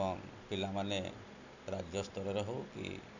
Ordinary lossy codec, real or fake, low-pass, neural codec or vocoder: none; real; 7.2 kHz; none